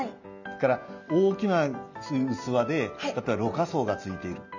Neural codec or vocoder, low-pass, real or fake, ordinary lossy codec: none; 7.2 kHz; real; none